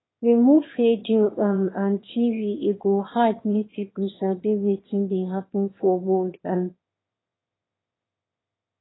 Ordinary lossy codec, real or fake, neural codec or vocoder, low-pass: AAC, 16 kbps; fake; autoencoder, 22.05 kHz, a latent of 192 numbers a frame, VITS, trained on one speaker; 7.2 kHz